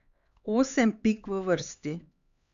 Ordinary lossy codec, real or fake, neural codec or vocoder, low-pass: Opus, 64 kbps; fake; codec, 16 kHz, 4 kbps, X-Codec, HuBERT features, trained on LibriSpeech; 7.2 kHz